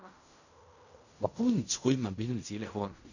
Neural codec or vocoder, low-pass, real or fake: codec, 16 kHz in and 24 kHz out, 0.4 kbps, LongCat-Audio-Codec, fine tuned four codebook decoder; 7.2 kHz; fake